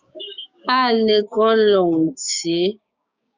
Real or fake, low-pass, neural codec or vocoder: fake; 7.2 kHz; codec, 16 kHz, 6 kbps, DAC